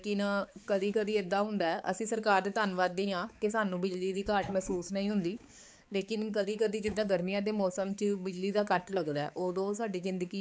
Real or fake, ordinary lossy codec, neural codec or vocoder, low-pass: fake; none; codec, 16 kHz, 4 kbps, X-Codec, HuBERT features, trained on balanced general audio; none